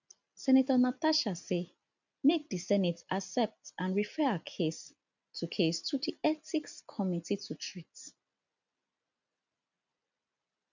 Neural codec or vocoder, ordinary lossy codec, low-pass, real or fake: none; none; 7.2 kHz; real